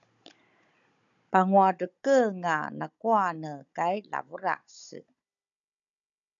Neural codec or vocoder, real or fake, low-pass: codec, 16 kHz, 16 kbps, FunCodec, trained on Chinese and English, 50 frames a second; fake; 7.2 kHz